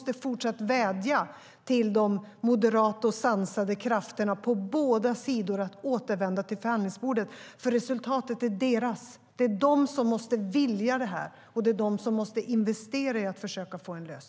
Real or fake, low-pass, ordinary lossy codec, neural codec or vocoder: real; none; none; none